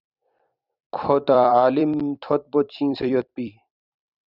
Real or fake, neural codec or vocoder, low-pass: fake; vocoder, 44.1 kHz, 128 mel bands every 256 samples, BigVGAN v2; 5.4 kHz